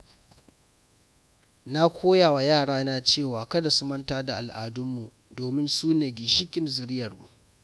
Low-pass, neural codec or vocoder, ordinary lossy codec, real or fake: none; codec, 24 kHz, 1.2 kbps, DualCodec; none; fake